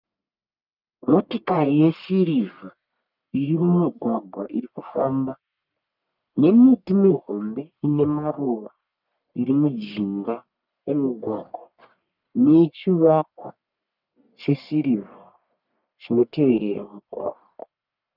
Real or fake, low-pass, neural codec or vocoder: fake; 5.4 kHz; codec, 44.1 kHz, 1.7 kbps, Pupu-Codec